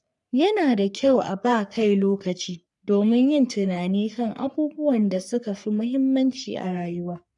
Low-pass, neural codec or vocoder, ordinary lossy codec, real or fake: 10.8 kHz; codec, 44.1 kHz, 3.4 kbps, Pupu-Codec; none; fake